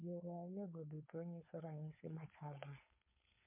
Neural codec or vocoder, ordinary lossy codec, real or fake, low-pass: codec, 16 kHz, 4.8 kbps, FACodec; MP3, 32 kbps; fake; 3.6 kHz